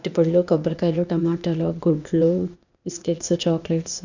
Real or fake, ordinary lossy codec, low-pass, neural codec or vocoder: fake; none; 7.2 kHz; codec, 16 kHz, 0.8 kbps, ZipCodec